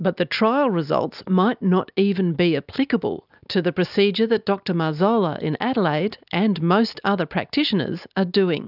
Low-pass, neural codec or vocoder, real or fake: 5.4 kHz; none; real